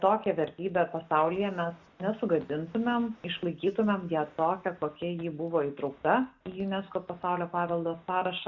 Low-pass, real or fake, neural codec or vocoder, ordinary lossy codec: 7.2 kHz; real; none; Opus, 64 kbps